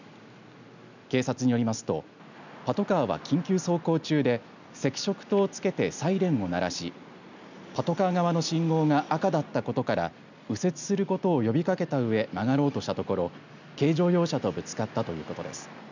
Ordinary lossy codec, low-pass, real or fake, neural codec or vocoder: none; 7.2 kHz; real; none